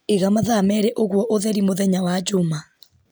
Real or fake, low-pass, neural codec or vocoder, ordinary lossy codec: real; none; none; none